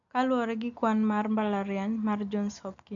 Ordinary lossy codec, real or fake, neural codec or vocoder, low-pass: none; real; none; 7.2 kHz